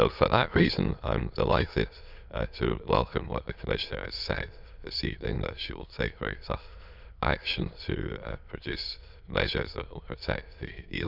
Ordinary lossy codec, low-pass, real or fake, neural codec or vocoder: none; 5.4 kHz; fake; autoencoder, 22.05 kHz, a latent of 192 numbers a frame, VITS, trained on many speakers